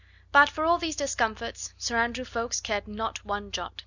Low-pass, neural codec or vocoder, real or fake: 7.2 kHz; none; real